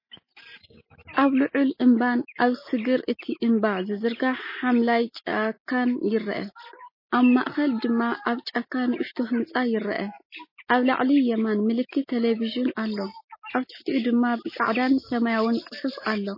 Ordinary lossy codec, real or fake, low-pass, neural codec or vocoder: MP3, 24 kbps; real; 5.4 kHz; none